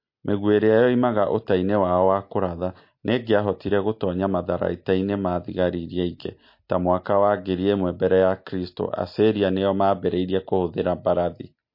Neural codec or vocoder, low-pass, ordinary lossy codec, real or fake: none; 5.4 kHz; MP3, 32 kbps; real